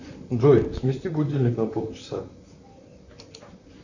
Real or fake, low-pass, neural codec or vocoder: fake; 7.2 kHz; vocoder, 44.1 kHz, 128 mel bands, Pupu-Vocoder